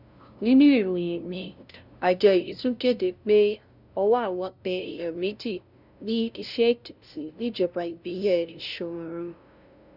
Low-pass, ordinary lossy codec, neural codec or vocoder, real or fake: 5.4 kHz; none; codec, 16 kHz, 0.5 kbps, FunCodec, trained on LibriTTS, 25 frames a second; fake